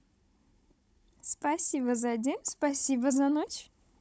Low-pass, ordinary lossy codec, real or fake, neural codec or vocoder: none; none; fake; codec, 16 kHz, 16 kbps, FunCodec, trained on Chinese and English, 50 frames a second